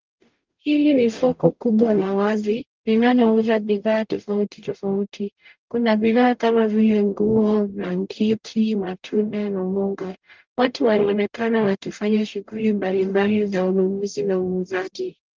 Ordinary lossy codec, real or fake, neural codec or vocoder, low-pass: Opus, 32 kbps; fake; codec, 44.1 kHz, 0.9 kbps, DAC; 7.2 kHz